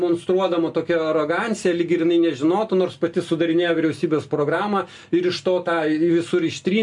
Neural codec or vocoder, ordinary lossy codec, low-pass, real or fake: none; MP3, 64 kbps; 10.8 kHz; real